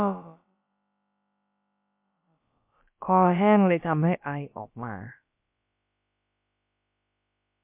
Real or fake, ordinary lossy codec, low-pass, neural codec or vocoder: fake; MP3, 32 kbps; 3.6 kHz; codec, 16 kHz, about 1 kbps, DyCAST, with the encoder's durations